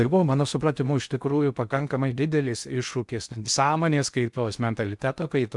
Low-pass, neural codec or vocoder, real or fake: 10.8 kHz; codec, 16 kHz in and 24 kHz out, 0.6 kbps, FocalCodec, streaming, 2048 codes; fake